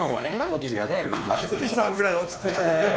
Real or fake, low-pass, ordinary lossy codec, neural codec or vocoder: fake; none; none; codec, 16 kHz, 2 kbps, X-Codec, WavLM features, trained on Multilingual LibriSpeech